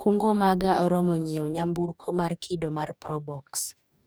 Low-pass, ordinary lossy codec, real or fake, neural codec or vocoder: none; none; fake; codec, 44.1 kHz, 2.6 kbps, DAC